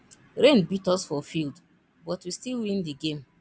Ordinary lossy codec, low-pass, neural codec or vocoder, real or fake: none; none; none; real